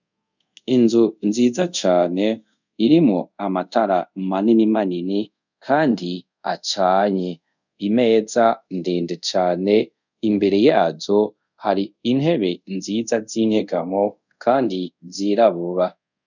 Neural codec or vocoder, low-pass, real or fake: codec, 24 kHz, 0.5 kbps, DualCodec; 7.2 kHz; fake